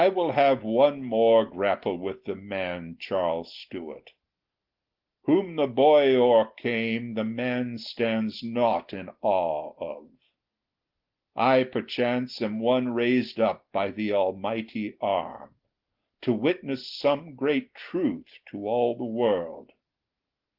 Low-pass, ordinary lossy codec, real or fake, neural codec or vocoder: 5.4 kHz; Opus, 16 kbps; real; none